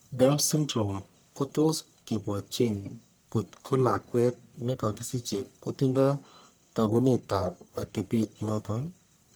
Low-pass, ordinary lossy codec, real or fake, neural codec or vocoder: none; none; fake; codec, 44.1 kHz, 1.7 kbps, Pupu-Codec